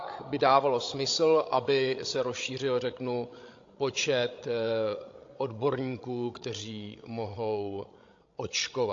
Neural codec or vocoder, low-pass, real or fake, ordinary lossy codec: codec, 16 kHz, 16 kbps, FreqCodec, larger model; 7.2 kHz; fake; AAC, 48 kbps